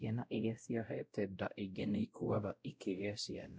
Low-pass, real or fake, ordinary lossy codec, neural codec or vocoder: none; fake; none; codec, 16 kHz, 0.5 kbps, X-Codec, WavLM features, trained on Multilingual LibriSpeech